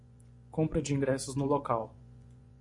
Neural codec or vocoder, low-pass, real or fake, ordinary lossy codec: vocoder, 24 kHz, 100 mel bands, Vocos; 10.8 kHz; fake; MP3, 64 kbps